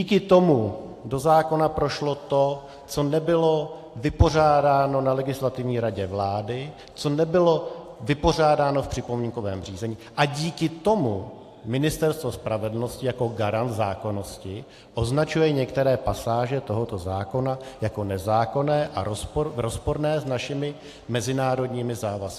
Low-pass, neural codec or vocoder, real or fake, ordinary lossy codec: 14.4 kHz; none; real; AAC, 64 kbps